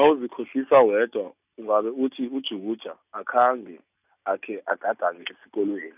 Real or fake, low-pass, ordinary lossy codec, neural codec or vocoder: real; 3.6 kHz; none; none